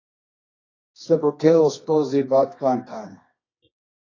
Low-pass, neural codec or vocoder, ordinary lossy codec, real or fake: 7.2 kHz; codec, 24 kHz, 0.9 kbps, WavTokenizer, medium music audio release; AAC, 32 kbps; fake